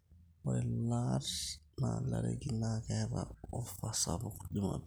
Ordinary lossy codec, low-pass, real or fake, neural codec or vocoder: none; none; real; none